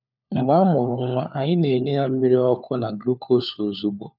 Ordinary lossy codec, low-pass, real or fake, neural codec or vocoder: none; 5.4 kHz; fake; codec, 16 kHz, 4 kbps, FunCodec, trained on LibriTTS, 50 frames a second